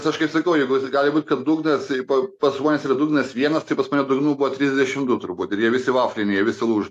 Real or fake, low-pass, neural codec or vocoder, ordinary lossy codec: real; 14.4 kHz; none; AAC, 64 kbps